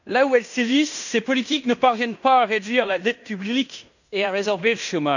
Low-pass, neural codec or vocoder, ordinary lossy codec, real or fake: 7.2 kHz; codec, 16 kHz in and 24 kHz out, 0.9 kbps, LongCat-Audio-Codec, fine tuned four codebook decoder; none; fake